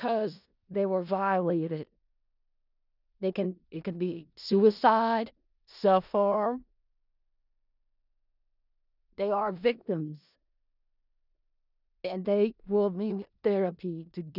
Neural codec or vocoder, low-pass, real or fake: codec, 16 kHz in and 24 kHz out, 0.4 kbps, LongCat-Audio-Codec, four codebook decoder; 5.4 kHz; fake